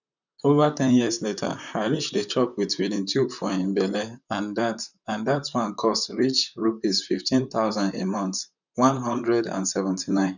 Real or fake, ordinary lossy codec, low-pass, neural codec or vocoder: fake; none; 7.2 kHz; vocoder, 44.1 kHz, 128 mel bands, Pupu-Vocoder